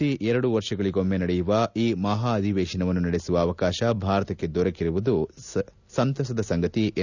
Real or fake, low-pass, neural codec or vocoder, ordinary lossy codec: real; 7.2 kHz; none; none